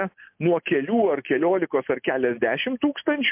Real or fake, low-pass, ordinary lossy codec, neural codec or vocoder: real; 3.6 kHz; MP3, 32 kbps; none